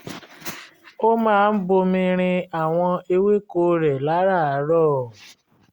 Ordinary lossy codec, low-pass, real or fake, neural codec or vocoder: Opus, 64 kbps; 19.8 kHz; real; none